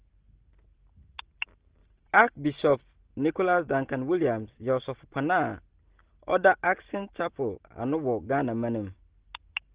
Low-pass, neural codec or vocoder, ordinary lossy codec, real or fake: 3.6 kHz; none; Opus, 16 kbps; real